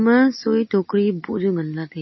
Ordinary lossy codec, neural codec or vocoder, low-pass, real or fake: MP3, 24 kbps; none; 7.2 kHz; real